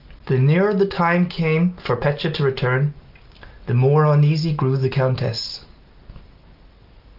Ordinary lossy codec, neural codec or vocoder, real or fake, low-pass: Opus, 32 kbps; none; real; 5.4 kHz